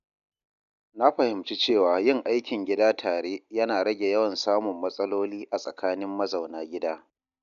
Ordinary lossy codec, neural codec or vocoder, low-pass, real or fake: none; none; 7.2 kHz; real